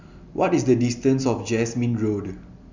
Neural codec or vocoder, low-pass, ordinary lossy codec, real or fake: none; 7.2 kHz; Opus, 64 kbps; real